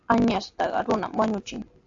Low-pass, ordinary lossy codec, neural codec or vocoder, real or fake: 7.2 kHz; AAC, 64 kbps; none; real